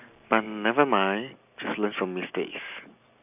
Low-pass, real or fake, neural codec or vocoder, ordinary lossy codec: 3.6 kHz; real; none; none